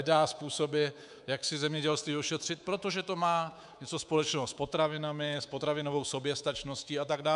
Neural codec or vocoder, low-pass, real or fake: codec, 24 kHz, 3.1 kbps, DualCodec; 10.8 kHz; fake